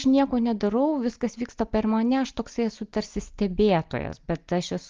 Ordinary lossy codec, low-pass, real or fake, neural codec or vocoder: Opus, 32 kbps; 7.2 kHz; real; none